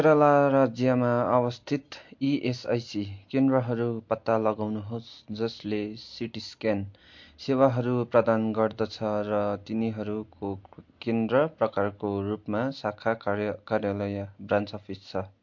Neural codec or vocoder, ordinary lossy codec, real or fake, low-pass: none; MP3, 48 kbps; real; 7.2 kHz